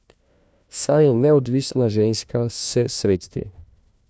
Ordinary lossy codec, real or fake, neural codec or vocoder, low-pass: none; fake; codec, 16 kHz, 1 kbps, FunCodec, trained on LibriTTS, 50 frames a second; none